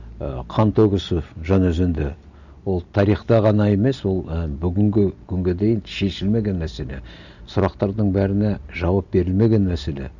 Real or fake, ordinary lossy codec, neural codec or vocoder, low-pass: real; none; none; 7.2 kHz